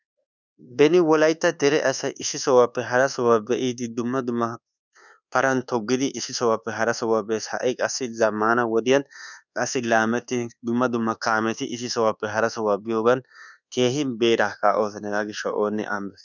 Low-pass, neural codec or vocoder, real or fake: 7.2 kHz; codec, 24 kHz, 1.2 kbps, DualCodec; fake